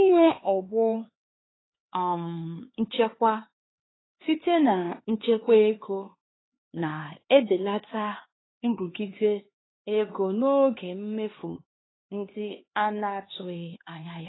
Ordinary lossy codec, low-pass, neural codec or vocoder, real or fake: AAC, 16 kbps; 7.2 kHz; codec, 16 kHz, 2 kbps, X-Codec, HuBERT features, trained on LibriSpeech; fake